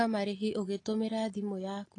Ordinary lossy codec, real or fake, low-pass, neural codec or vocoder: AAC, 32 kbps; real; 10.8 kHz; none